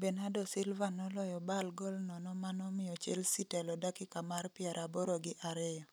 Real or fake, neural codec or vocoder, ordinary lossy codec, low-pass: real; none; none; none